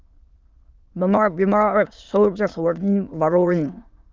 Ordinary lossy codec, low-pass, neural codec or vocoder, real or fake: Opus, 24 kbps; 7.2 kHz; autoencoder, 22.05 kHz, a latent of 192 numbers a frame, VITS, trained on many speakers; fake